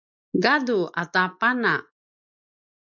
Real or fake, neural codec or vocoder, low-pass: real; none; 7.2 kHz